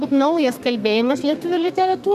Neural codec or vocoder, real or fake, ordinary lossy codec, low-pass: codec, 32 kHz, 1.9 kbps, SNAC; fake; MP3, 96 kbps; 14.4 kHz